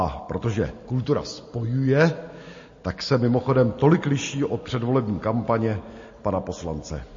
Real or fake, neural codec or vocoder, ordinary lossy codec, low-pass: real; none; MP3, 32 kbps; 7.2 kHz